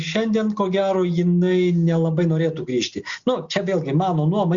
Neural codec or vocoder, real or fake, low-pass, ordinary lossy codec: none; real; 7.2 kHz; Opus, 64 kbps